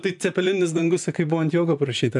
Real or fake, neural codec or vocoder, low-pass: fake; vocoder, 44.1 kHz, 128 mel bands, Pupu-Vocoder; 10.8 kHz